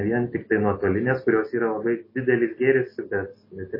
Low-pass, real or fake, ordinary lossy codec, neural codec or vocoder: 5.4 kHz; real; MP3, 24 kbps; none